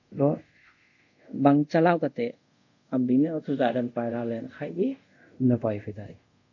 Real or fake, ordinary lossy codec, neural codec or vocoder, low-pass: fake; MP3, 48 kbps; codec, 24 kHz, 0.5 kbps, DualCodec; 7.2 kHz